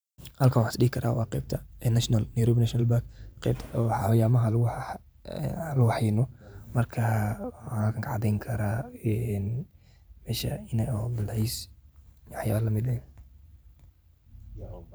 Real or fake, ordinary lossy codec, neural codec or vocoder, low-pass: fake; none; vocoder, 44.1 kHz, 128 mel bands every 512 samples, BigVGAN v2; none